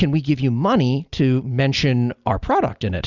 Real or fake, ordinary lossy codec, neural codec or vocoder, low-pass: real; Opus, 64 kbps; none; 7.2 kHz